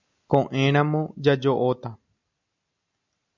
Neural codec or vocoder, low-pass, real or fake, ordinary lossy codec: none; 7.2 kHz; real; MP3, 64 kbps